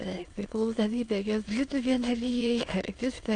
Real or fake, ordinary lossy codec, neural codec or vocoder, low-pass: fake; AAC, 48 kbps; autoencoder, 22.05 kHz, a latent of 192 numbers a frame, VITS, trained on many speakers; 9.9 kHz